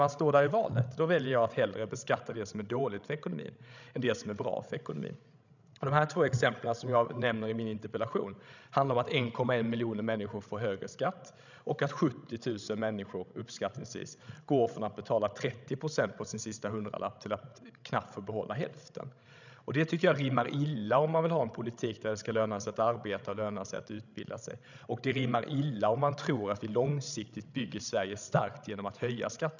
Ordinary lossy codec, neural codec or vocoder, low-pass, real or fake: none; codec, 16 kHz, 16 kbps, FreqCodec, larger model; 7.2 kHz; fake